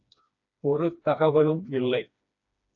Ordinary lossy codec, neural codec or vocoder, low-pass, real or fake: AAC, 64 kbps; codec, 16 kHz, 2 kbps, FreqCodec, smaller model; 7.2 kHz; fake